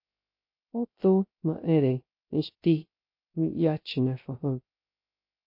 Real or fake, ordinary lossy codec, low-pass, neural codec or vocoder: fake; MP3, 32 kbps; 5.4 kHz; codec, 16 kHz, 0.3 kbps, FocalCodec